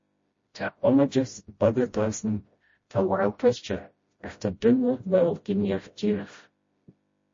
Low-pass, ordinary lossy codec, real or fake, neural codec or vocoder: 7.2 kHz; MP3, 32 kbps; fake; codec, 16 kHz, 0.5 kbps, FreqCodec, smaller model